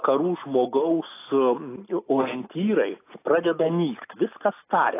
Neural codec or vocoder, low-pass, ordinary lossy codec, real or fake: none; 3.6 kHz; AAC, 24 kbps; real